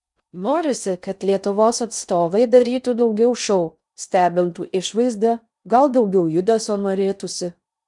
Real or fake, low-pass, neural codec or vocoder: fake; 10.8 kHz; codec, 16 kHz in and 24 kHz out, 0.6 kbps, FocalCodec, streaming, 4096 codes